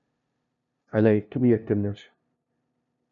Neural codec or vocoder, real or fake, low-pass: codec, 16 kHz, 0.5 kbps, FunCodec, trained on LibriTTS, 25 frames a second; fake; 7.2 kHz